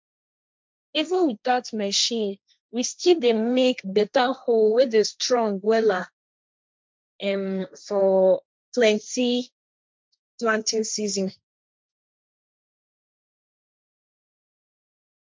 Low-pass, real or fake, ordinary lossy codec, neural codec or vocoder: none; fake; none; codec, 16 kHz, 1.1 kbps, Voila-Tokenizer